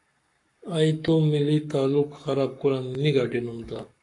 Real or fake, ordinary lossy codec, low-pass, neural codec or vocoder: fake; AAC, 48 kbps; 10.8 kHz; codec, 44.1 kHz, 7.8 kbps, Pupu-Codec